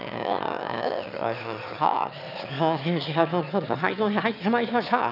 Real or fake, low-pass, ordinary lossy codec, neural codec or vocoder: fake; 5.4 kHz; none; autoencoder, 22.05 kHz, a latent of 192 numbers a frame, VITS, trained on one speaker